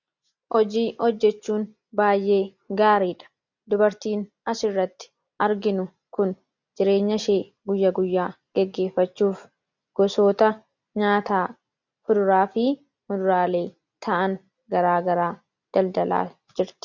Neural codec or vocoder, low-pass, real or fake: none; 7.2 kHz; real